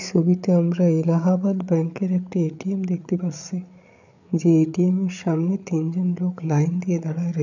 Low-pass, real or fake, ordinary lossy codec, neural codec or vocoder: 7.2 kHz; fake; none; codec, 16 kHz, 8 kbps, FreqCodec, larger model